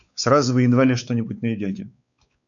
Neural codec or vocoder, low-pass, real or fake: codec, 16 kHz, 4 kbps, X-Codec, WavLM features, trained on Multilingual LibriSpeech; 7.2 kHz; fake